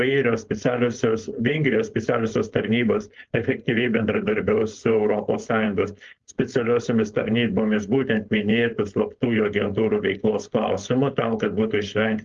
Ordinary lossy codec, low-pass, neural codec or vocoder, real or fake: Opus, 32 kbps; 7.2 kHz; codec, 16 kHz, 4.8 kbps, FACodec; fake